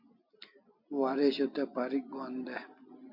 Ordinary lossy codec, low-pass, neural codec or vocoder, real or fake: MP3, 48 kbps; 5.4 kHz; none; real